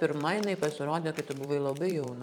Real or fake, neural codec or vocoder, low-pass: fake; vocoder, 44.1 kHz, 128 mel bands every 512 samples, BigVGAN v2; 19.8 kHz